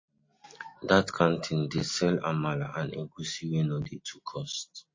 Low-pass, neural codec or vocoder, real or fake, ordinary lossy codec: 7.2 kHz; none; real; MP3, 32 kbps